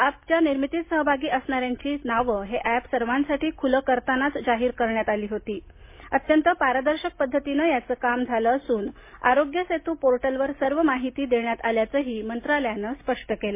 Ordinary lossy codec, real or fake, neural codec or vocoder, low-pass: MP3, 24 kbps; real; none; 3.6 kHz